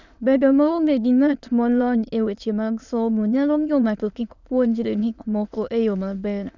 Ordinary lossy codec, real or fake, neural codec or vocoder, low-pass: none; fake; autoencoder, 22.05 kHz, a latent of 192 numbers a frame, VITS, trained on many speakers; 7.2 kHz